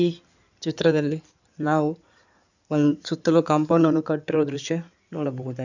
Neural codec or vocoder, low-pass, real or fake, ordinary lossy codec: codec, 16 kHz in and 24 kHz out, 2.2 kbps, FireRedTTS-2 codec; 7.2 kHz; fake; none